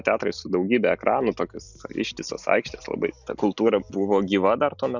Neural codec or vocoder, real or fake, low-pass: none; real; 7.2 kHz